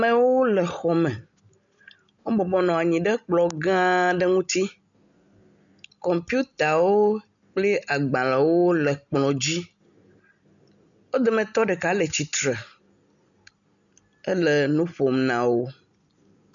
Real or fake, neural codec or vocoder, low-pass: real; none; 7.2 kHz